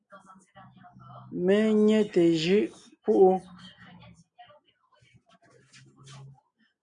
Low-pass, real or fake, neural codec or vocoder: 9.9 kHz; real; none